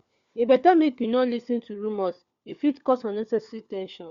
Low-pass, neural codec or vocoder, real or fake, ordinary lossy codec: 7.2 kHz; codec, 16 kHz, 4 kbps, FunCodec, trained on LibriTTS, 50 frames a second; fake; Opus, 64 kbps